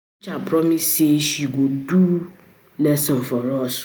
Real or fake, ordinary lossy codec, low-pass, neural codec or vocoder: real; none; none; none